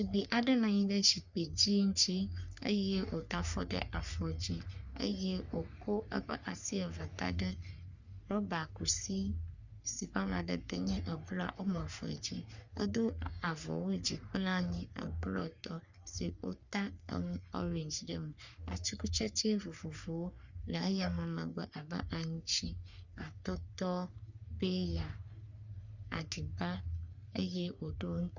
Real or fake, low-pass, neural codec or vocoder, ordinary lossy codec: fake; 7.2 kHz; codec, 44.1 kHz, 3.4 kbps, Pupu-Codec; Opus, 64 kbps